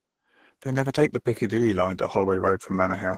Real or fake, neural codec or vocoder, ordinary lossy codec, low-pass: fake; codec, 32 kHz, 1.9 kbps, SNAC; Opus, 16 kbps; 14.4 kHz